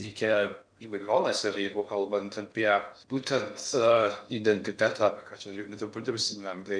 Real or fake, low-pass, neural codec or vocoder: fake; 9.9 kHz; codec, 16 kHz in and 24 kHz out, 0.6 kbps, FocalCodec, streaming, 4096 codes